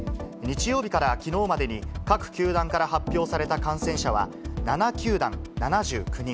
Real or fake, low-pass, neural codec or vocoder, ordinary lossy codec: real; none; none; none